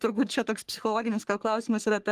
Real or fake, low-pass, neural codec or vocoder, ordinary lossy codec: fake; 14.4 kHz; codec, 44.1 kHz, 3.4 kbps, Pupu-Codec; Opus, 24 kbps